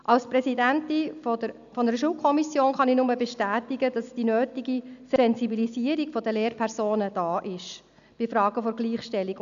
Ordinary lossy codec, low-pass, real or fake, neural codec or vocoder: none; 7.2 kHz; real; none